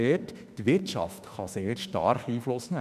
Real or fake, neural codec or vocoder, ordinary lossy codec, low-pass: fake; autoencoder, 48 kHz, 32 numbers a frame, DAC-VAE, trained on Japanese speech; none; 14.4 kHz